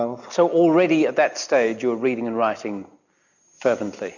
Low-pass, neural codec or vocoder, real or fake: 7.2 kHz; none; real